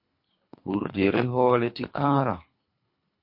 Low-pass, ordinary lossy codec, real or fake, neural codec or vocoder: 5.4 kHz; MP3, 32 kbps; fake; codec, 24 kHz, 3 kbps, HILCodec